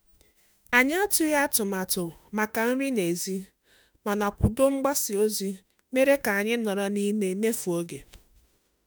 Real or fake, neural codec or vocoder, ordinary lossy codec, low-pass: fake; autoencoder, 48 kHz, 32 numbers a frame, DAC-VAE, trained on Japanese speech; none; none